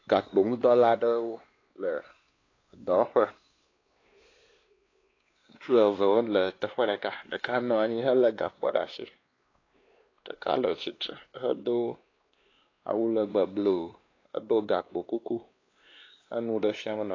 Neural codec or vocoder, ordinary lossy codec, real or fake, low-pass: codec, 16 kHz, 2 kbps, X-Codec, WavLM features, trained on Multilingual LibriSpeech; AAC, 32 kbps; fake; 7.2 kHz